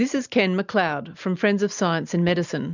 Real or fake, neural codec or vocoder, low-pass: real; none; 7.2 kHz